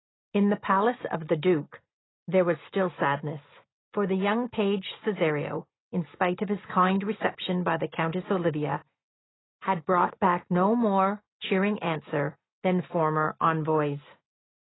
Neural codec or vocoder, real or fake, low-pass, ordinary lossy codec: none; real; 7.2 kHz; AAC, 16 kbps